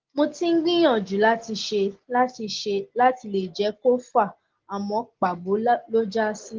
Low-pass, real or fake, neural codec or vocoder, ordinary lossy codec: 7.2 kHz; real; none; Opus, 16 kbps